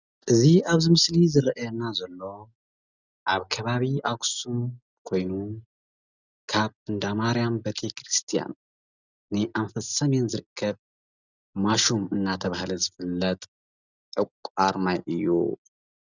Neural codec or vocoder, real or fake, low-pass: none; real; 7.2 kHz